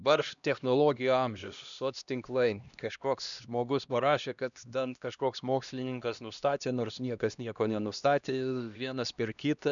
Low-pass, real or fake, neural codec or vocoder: 7.2 kHz; fake; codec, 16 kHz, 1 kbps, X-Codec, HuBERT features, trained on LibriSpeech